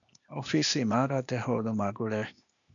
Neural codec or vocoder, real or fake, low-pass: codec, 16 kHz, 0.8 kbps, ZipCodec; fake; 7.2 kHz